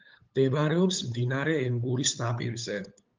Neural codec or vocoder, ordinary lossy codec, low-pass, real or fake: codec, 16 kHz, 8 kbps, FunCodec, trained on LibriTTS, 25 frames a second; Opus, 32 kbps; 7.2 kHz; fake